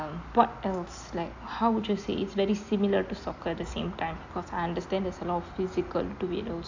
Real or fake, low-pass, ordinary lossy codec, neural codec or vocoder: real; 7.2 kHz; AAC, 48 kbps; none